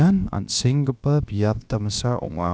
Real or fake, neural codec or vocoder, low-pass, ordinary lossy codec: fake; codec, 16 kHz, about 1 kbps, DyCAST, with the encoder's durations; none; none